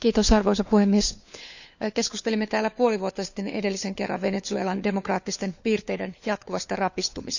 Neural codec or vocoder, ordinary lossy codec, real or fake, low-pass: codec, 16 kHz, 4 kbps, FunCodec, trained on LibriTTS, 50 frames a second; none; fake; 7.2 kHz